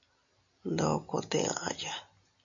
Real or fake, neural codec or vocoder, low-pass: real; none; 7.2 kHz